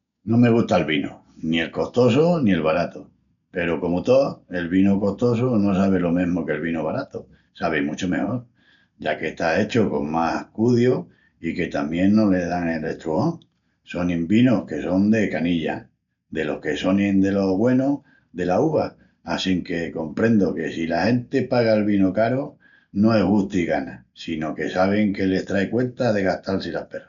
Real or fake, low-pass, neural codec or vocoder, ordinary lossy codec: real; 7.2 kHz; none; none